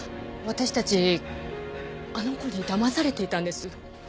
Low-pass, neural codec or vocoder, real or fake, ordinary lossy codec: none; none; real; none